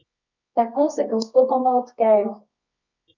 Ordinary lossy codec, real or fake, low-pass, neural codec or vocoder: none; fake; 7.2 kHz; codec, 24 kHz, 0.9 kbps, WavTokenizer, medium music audio release